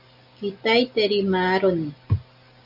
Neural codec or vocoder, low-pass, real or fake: none; 5.4 kHz; real